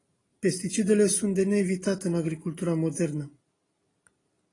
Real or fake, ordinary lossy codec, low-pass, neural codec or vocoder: real; AAC, 32 kbps; 10.8 kHz; none